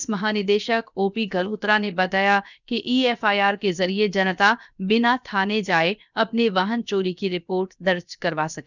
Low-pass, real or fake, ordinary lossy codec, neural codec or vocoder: 7.2 kHz; fake; none; codec, 16 kHz, 0.7 kbps, FocalCodec